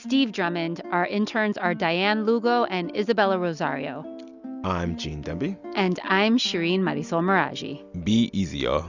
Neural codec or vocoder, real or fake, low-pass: none; real; 7.2 kHz